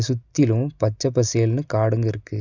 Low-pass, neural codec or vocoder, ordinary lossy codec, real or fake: 7.2 kHz; none; none; real